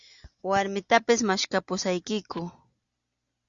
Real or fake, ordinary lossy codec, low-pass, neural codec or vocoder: real; Opus, 64 kbps; 7.2 kHz; none